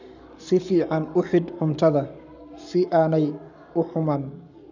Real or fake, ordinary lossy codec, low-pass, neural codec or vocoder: fake; none; 7.2 kHz; codec, 44.1 kHz, 7.8 kbps, Pupu-Codec